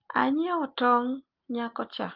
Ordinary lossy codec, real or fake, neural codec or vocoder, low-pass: Opus, 32 kbps; real; none; 5.4 kHz